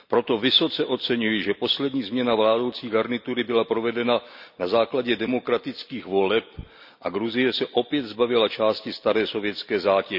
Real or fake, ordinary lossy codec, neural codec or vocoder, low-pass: real; none; none; 5.4 kHz